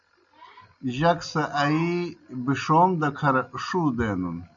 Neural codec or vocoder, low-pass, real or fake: none; 7.2 kHz; real